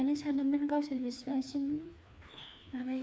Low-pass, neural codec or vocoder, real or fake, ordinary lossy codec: none; codec, 16 kHz, 2 kbps, FreqCodec, larger model; fake; none